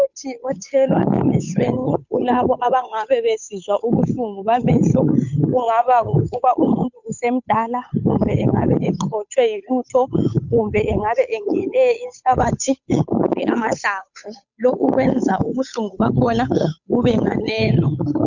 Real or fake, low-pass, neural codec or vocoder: fake; 7.2 kHz; codec, 16 kHz, 8 kbps, FunCodec, trained on Chinese and English, 25 frames a second